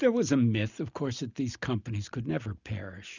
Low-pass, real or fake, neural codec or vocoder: 7.2 kHz; real; none